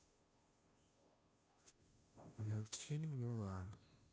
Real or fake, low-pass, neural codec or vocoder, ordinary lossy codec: fake; none; codec, 16 kHz, 0.5 kbps, FunCodec, trained on Chinese and English, 25 frames a second; none